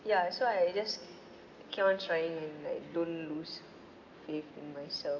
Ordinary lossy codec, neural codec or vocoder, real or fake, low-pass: none; none; real; 7.2 kHz